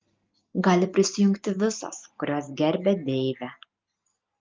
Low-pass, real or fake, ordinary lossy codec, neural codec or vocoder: 7.2 kHz; real; Opus, 32 kbps; none